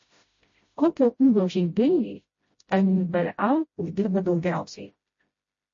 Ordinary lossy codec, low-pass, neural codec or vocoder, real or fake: MP3, 32 kbps; 7.2 kHz; codec, 16 kHz, 0.5 kbps, FreqCodec, smaller model; fake